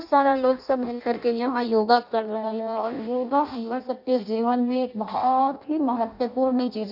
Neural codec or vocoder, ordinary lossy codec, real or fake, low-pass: codec, 16 kHz in and 24 kHz out, 0.6 kbps, FireRedTTS-2 codec; none; fake; 5.4 kHz